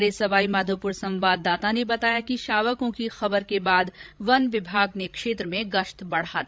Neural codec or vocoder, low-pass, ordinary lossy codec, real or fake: codec, 16 kHz, 16 kbps, FreqCodec, larger model; none; none; fake